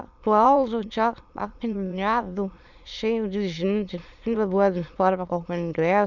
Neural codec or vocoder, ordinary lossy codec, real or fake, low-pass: autoencoder, 22.05 kHz, a latent of 192 numbers a frame, VITS, trained on many speakers; none; fake; 7.2 kHz